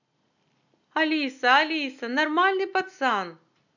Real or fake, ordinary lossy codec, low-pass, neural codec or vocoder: real; none; 7.2 kHz; none